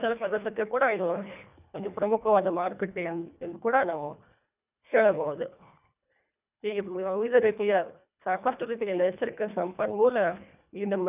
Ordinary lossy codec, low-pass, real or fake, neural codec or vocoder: none; 3.6 kHz; fake; codec, 24 kHz, 1.5 kbps, HILCodec